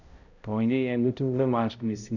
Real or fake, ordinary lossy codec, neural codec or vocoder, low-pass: fake; none; codec, 16 kHz, 0.5 kbps, X-Codec, HuBERT features, trained on balanced general audio; 7.2 kHz